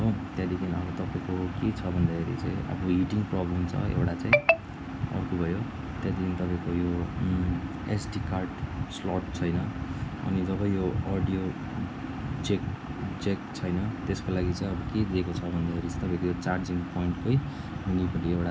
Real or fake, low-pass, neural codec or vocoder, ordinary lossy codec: real; none; none; none